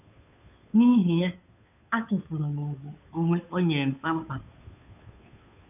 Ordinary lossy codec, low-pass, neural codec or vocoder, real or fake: none; 3.6 kHz; codec, 16 kHz, 2 kbps, FunCodec, trained on Chinese and English, 25 frames a second; fake